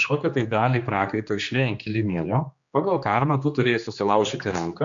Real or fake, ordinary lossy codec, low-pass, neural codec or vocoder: fake; MP3, 64 kbps; 7.2 kHz; codec, 16 kHz, 2 kbps, X-Codec, HuBERT features, trained on general audio